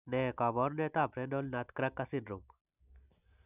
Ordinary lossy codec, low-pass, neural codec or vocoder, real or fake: none; 3.6 kHz; none; real